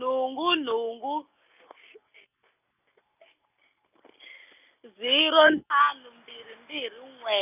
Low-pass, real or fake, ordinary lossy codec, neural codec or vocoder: 3.6 kHz; real; none; none